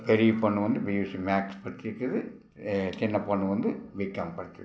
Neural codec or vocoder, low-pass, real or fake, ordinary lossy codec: none; none; real; none